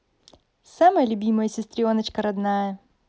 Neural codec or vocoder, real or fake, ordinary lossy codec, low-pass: none; real; none; none